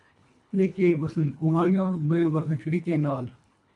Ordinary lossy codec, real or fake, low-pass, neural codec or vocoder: MP3, 64 kbps; fake; 10.8 kHz; codec, 24 kHz, 1.5 kbps, HILCodec